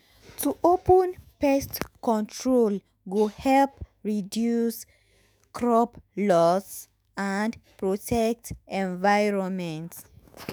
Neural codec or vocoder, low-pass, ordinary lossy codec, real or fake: autoencoder, 48 kHz, 128 numbers a frame, DAC-VAE, trained on Japanese speech; none; none; fake